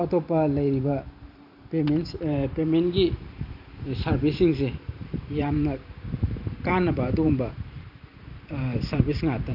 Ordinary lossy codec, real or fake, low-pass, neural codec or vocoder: none; fake; 5.4 kHz; vocoder, 44.1 kHz, 128 mel bands every 512 samples, BigVGAN v2